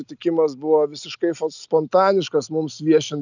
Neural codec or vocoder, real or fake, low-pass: none; real; 7.2 kHz